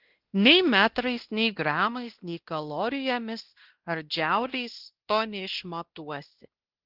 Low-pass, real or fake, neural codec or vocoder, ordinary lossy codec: 5.4 kHz; fake; codec, 16 kHz, 1 kbps, X-Codec, WavLM features, trained on Multilingual LibriSpeech; Opus, 16 kbps